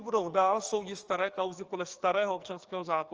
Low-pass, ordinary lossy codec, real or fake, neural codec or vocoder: 7.2 kHz; Opus, 24 kbps; fake; codec, 44.1 kHz, 2.6 kbps, SNAC